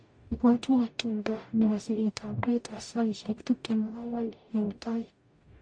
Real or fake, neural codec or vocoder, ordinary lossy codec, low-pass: fake; codec, 44.1 kHz, 0.9 kbps, DAC; MP3, 48 kbps; 9.9 kHz